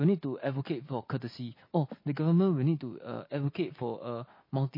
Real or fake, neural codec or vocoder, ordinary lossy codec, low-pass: real; none; MP3, 24 kbps; 5.4 kHz